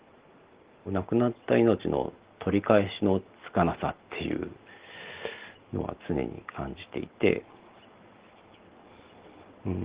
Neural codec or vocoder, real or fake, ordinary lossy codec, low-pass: none; real; Opus, 16 kbps; 3.6 kHz